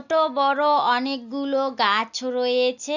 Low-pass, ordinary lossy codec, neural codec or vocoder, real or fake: 7.2 kHz; none; none; real